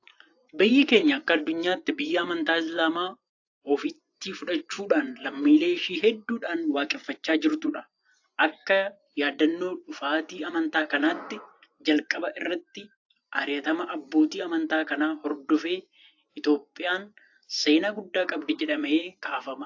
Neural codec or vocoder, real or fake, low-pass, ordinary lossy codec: vocoder, 24 kHz, 100 mel bands, Vocos; fake; 7.2 kHz; AAC, 48 kbps